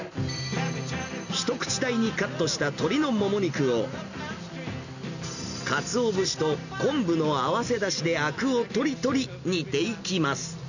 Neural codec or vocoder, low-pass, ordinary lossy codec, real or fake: none; 7.2 kHz; none; real